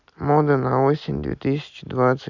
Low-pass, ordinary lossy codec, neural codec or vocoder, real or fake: 7.2 kHz; none; none; real